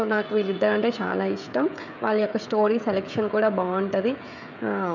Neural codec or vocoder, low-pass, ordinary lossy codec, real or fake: codec, 16 kHz, 16 kbps, FreqCodec, smaller model; 7.2 kHz; none; fake